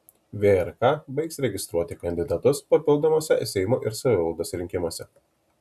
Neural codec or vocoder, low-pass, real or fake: none; 14.4 kHz; real